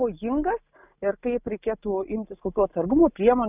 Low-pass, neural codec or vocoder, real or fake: 3.6 kHz; none; real